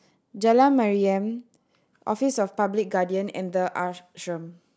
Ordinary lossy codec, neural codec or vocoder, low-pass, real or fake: none; none; none; real